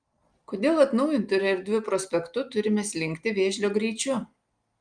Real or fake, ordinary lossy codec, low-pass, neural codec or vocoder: real; Opus, 32 kbps; 9.9 kHz; none